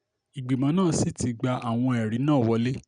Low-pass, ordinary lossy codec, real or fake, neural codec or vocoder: 9.9 kHz; none; real; none